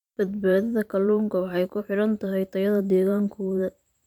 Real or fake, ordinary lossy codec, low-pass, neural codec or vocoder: fake; none; 19.8 kHz; vocoder, 44.1 kHz, 128 mel bands every 512 samples, BigVGAN v2